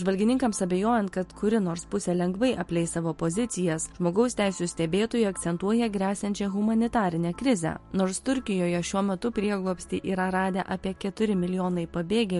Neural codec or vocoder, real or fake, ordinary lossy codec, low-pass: none; real; MP3, 48 kbps; 14.4 kHz